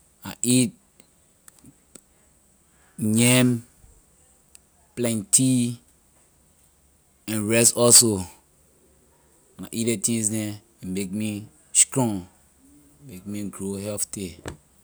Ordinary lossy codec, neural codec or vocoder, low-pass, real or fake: none; none; none; real